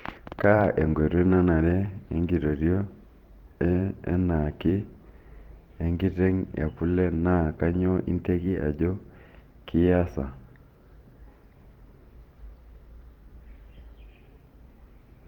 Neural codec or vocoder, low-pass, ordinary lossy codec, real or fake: vocoder, 44.1 kHz, 128 mel bands every 512 samples, BigVGAN v2; 19.8 kHz; Opus, 32 kbps; fake